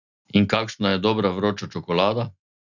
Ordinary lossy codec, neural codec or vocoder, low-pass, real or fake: none; none; 7.2 kHz; real